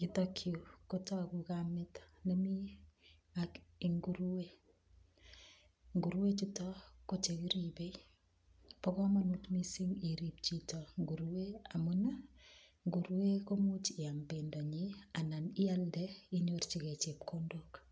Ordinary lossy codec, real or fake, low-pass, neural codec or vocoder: none; real; none; none